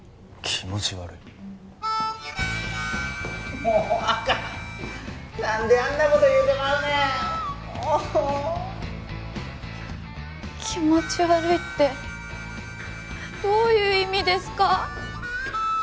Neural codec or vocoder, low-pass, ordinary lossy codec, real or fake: none; none; none; real